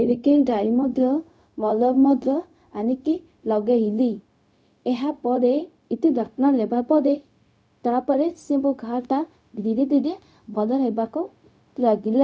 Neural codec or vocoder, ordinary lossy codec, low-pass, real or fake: codec, 16 kHz, 0.4 kbps, LongCat-Audio-Codec; none; none; fake